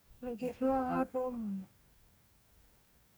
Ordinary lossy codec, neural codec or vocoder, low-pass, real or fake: none; codec, 44.1 kHz, 2.6 kbps, DAC; none; fake